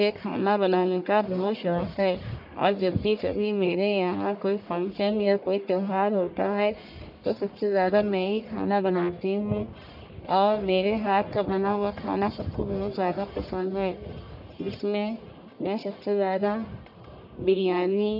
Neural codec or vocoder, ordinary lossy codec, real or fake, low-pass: codec, 44.1 kHz, 1.7 kbps, Pupu-Codec; none; fake; 5.4 kHz